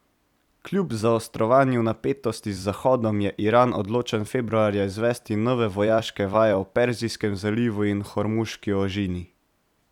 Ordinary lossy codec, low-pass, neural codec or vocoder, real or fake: none; 19.8 kHz; vocoder, 44.1 kHz, 128 mel bands every 256 samples, BigVGAN v2; fake